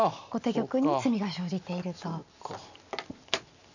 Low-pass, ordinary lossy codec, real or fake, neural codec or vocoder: 7.2 kHz; none; real; none